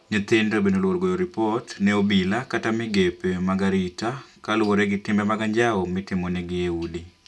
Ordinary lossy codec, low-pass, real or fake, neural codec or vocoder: none; none; real; none